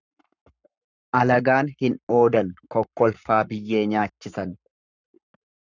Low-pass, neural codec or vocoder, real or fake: 7.2 kHz; codec, 44.1 kHz, 7.8 kbps, Pupu-Codec; fake